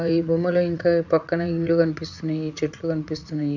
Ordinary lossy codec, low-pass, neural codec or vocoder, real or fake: none; 7.2 kHz; vocoder, 44.1 kHz, 80 mel bands, Vocos; fake